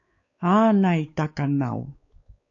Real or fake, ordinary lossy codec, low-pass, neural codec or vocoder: fake; AAC, 48 kbps; 7.2 kHz; codec, 16 kHz, 4 kbps, X-Codec, WavLM features, trained on Multilingual LibriSpeech